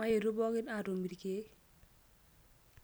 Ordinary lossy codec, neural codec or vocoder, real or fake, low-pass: none; none; real; none